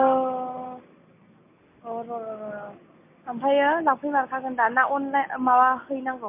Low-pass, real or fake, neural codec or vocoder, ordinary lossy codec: 3.6 kHz; real; none; MP3, 24 kbps